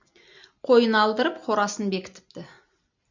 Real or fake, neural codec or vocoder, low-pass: real; none; 7.2 kHz